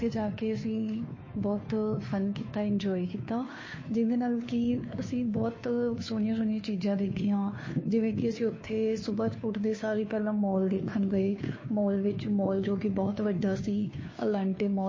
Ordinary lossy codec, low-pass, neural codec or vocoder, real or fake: MP3, 32 kbps; 7.2 kHz; codec, 16 kHz, 2 kbps, FunCodec, trained on Chinese and English, 25 frames a second; fake